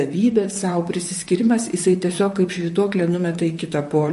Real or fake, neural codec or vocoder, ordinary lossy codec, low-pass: fake; vocoder, 44.1 kHz, 128 mel bands, Pupu-Vocoder; MP3, 48 kbps; 14.4 kHz